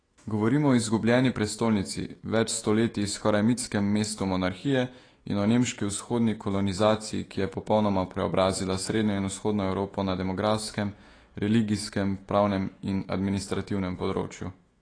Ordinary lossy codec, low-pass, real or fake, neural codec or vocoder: AAC, 32 kbps; 9.9 kHz; fake; autoencoder, 48 kHz, 128 numbers a frame, DAC-VAE, trained on Japanese speech